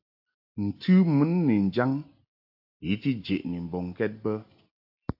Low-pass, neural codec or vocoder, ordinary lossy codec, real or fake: 5.4 kHz; none; AAC, 48 kbps; real